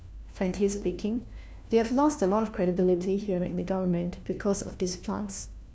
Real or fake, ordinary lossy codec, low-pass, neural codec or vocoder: fake; none; none; codec, 16 kHz, 1 kbps, FunCodec, trained on LibriTTS, 50 frames a second